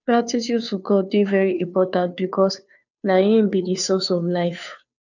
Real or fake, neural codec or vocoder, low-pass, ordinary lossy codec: fake; codec, 16 kHz, 2 kbps, FunCodec, trained on Chinese and English, 25 frames a second; 7.2 kHz; none